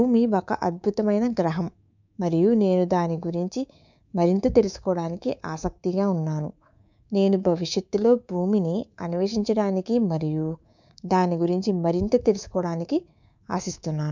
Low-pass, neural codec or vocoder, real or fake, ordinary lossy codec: 7.2 kHz; codec, 24 kHz, 3.1 kbps, DualCodec; fake; none